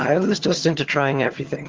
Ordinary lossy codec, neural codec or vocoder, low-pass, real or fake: Opus, 16 kbps; vocoder, 22.05 kHz, 80 mel bands, HiFi-GAN; 7.2 kHz; fake